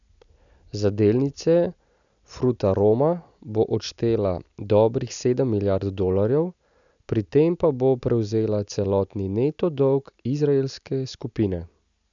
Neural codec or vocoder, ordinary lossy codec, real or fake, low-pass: none; none; real; 7.2 kHz